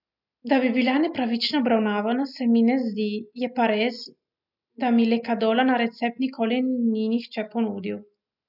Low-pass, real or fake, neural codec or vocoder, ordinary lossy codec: 5.4 kHz; real; none; none